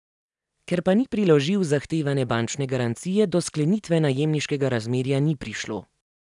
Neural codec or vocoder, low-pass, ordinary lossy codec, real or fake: none; 10.8 kHz; none; real